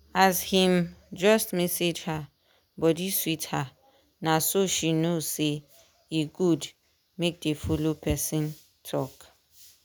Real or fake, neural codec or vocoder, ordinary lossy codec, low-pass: real; none; none; none